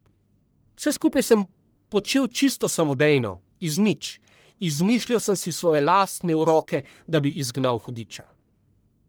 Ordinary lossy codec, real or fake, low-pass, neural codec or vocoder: none; fake; none; codec, 44.1 kHz, 1.7 kbps, Pupu-Codec